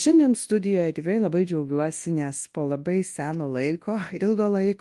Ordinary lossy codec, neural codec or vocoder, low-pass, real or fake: Opus, 24 kbps; codec, 24 kHz, 0.9 kbps, WavTokenizer, large speech release; 10.8 kHz; fake